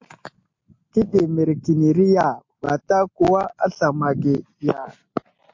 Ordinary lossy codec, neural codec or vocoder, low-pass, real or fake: MP3, 48 kbps; none; 7.2 kHz; real